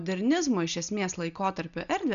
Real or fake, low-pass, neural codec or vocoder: real; 7.2 kHz; none